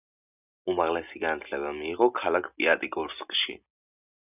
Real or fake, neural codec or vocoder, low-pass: real; none; 3.6 kHz